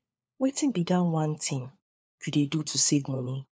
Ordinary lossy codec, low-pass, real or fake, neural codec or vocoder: none; none; fake; codec, 16 kHz, 4 kbps, FunCodec, trained on LibriTTS, 50 frames a second